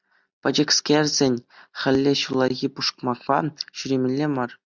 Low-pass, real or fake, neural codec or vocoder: 7.2 kHz; real; none